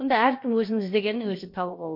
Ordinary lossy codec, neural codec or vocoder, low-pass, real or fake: MP3, 32 kbps; codec, 16 kHz, about 1 kbps, DyCAST, with the encoder's durations; 5.4 kHz; fake